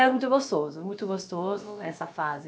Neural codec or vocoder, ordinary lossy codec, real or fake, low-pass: codec, 16 kHz, about 1 kbps, DyCAST, with the encoder's durations; none; fake; none